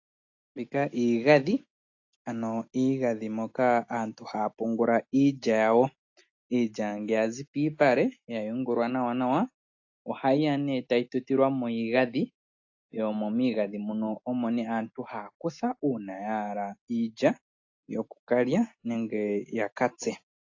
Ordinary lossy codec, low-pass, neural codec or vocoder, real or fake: AAC, 48 kbps; 7.2 kHz; none; real